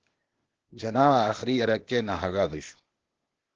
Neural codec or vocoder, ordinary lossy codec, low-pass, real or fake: codec, 16 kHz, 0.8 kbps, ZipCodec; Opus, 16 kbps; 7.2 kHz; fake